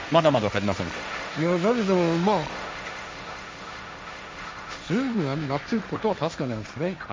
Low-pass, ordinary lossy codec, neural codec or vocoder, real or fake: none; none; codec, 16 kHz, 1.1 kbps, Voila-Tokenizer; fake